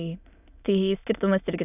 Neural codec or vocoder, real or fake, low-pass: autoencoder, 22.05 kHz, a latent of 192 numbers a frame, VITS, trained on many speakers; fake; 3.6 kHz